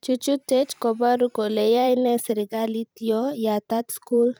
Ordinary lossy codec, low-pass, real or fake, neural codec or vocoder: none; none; fake; vocoder, 44.1 kHz, 128 mel bands every 512 samples, BigVGAN v2